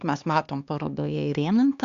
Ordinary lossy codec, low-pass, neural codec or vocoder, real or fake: Opus, 64 kbps; 7.2 kHz; codec, 16 kHz, 2 kbps, X-Codec, HuBERT features, trained on LibriSpeech; fake